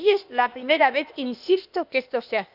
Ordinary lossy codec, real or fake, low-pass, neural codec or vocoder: none; fake; 5.4 kHz; codec, 16 kHz, 0.8 kbps, ZipCodec